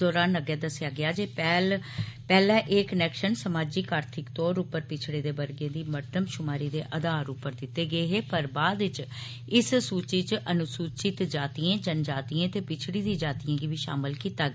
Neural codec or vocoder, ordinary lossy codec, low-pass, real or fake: none; none; none; real